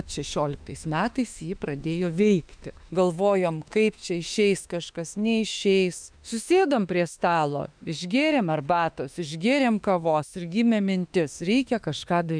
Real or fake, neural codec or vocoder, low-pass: fake; autoencoder, 48 kHz, 32 numbers a frame, DAC-VAE, trained on Japanese speech; 9.9 kHz